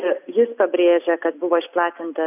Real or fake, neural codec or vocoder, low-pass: real; none; 3.6 kHz